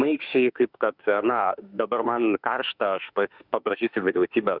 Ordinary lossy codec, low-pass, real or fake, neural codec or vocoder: Opus, 64 kbps; 5.4 kHz; fake; autoencoder, 48 kHz, 32 numbers a frame, DAC-VAE, trained on Japanese speech